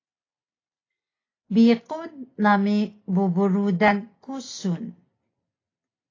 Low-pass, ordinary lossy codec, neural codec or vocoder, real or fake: 7.2 kHz; AAC, 32 kbps; none; real